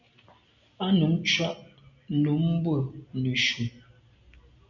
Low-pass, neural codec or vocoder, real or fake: 7.2 kHz; none; real